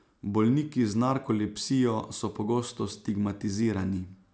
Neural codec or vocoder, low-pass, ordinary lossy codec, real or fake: none; none; none; real